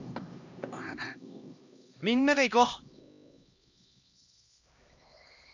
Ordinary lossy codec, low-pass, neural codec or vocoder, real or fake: none; 7.2 kHz; codec, 16 kHz, 1 kbps, X-Codec, HuBERT features, trained on LibriSpeech; fake